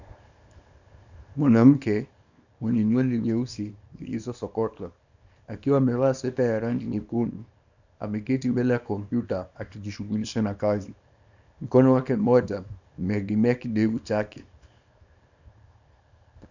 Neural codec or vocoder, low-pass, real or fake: codec, 24 kHz, 0.9 kbps, WavTokenizer, small release; 7.2 kHz; fake